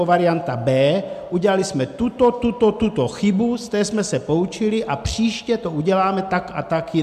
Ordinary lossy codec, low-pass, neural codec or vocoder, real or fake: MP3, 96 kbps; 14.4 kHz; none; real